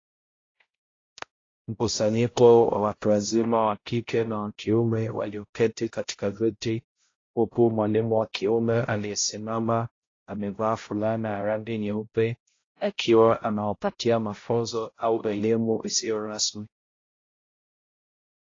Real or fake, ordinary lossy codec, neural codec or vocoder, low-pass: fake; AAC, 32 kbps; codec, 16 kHz, 0.5 kbps, X-Codec, HuBERT features, trained on balanced general audio; 7.2 kHz